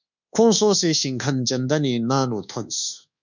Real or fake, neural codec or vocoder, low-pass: fake; codec, 24 kHz, 1.2 kbps, DualCodec; 7.2 kHz